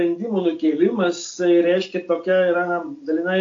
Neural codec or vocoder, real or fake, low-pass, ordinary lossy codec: none; real; 7.2 kHz; AAC, 48 kbps